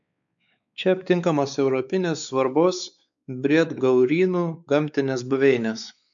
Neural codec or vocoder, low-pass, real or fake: codec, 16 kHz, 4 kbps, X-Codec, WavLM features, trained on Multilingual LibriSpeech; 7.2 kHz; fake